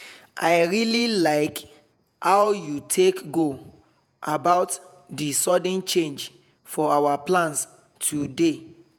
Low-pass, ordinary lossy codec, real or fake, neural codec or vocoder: none; none; fake; vocoder, 48 kHz, 128 mel bands, Vocos